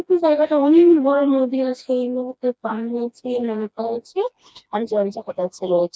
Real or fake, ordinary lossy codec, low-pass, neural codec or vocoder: fake; none; none; codec, 16 kHz, 1 kbps, FreqCodec, smaller model